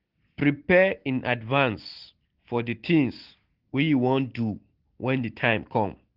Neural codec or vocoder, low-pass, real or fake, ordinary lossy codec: none; 5.4 kHz; real; Opus, 16 kbps